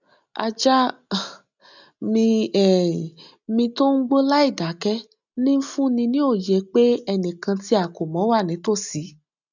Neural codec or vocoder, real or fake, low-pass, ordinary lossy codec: none; real; 7.2 kHz; none